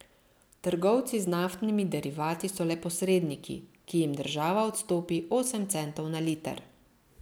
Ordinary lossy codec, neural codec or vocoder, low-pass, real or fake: none; none; none; real